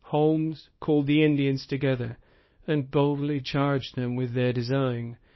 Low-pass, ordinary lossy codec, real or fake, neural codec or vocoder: 7.2 kHz; MP3, 24 kbps; fake; codec, 24 kHz, 0.9 kbps, WavTokenizer, small release